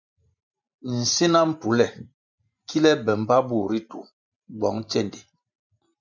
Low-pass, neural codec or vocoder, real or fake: 7.2 kHz; vocoder, 44.1 kHz, 128 mel bands every 512 samples, BigVGAN v2; fake